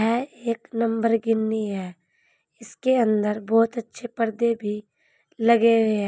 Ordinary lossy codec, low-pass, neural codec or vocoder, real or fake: none; none; none; real